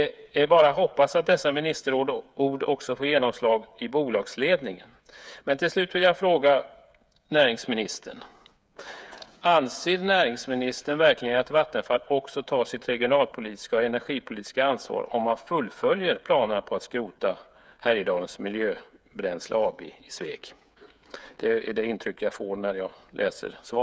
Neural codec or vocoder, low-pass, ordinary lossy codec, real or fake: codec, 16 kHz, 8 kbps, FreqCodec, smaller model; none; none; fake